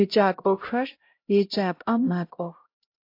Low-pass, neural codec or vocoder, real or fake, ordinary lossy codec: 5.4 kHz; codec, 16 kHz, 0.5 kbps, X-Codec, HuBERT features, trained on LibriSpeech; fake; AAC, 32 kbps